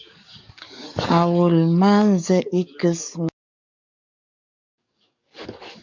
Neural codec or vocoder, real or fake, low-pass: codec, 44.1 kHz, 7.8 kbps, DAC; fake; 7.2 kHz